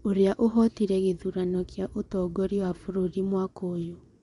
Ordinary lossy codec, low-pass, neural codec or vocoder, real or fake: none; 10.8 kHz; vocoder, 24 kHz, 100 mel bands, Vocos; fake